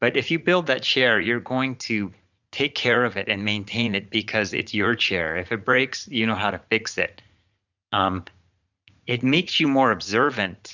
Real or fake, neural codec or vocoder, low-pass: fake; vocoder, 44.1 kHz, 80 mel bands, Vocos; 7.2 kHz